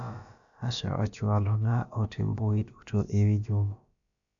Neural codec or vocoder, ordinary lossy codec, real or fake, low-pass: codec, 16 kHz, about 1 kbps, DyCAST, with the encoder's durations; none; fake; 7.2 kHz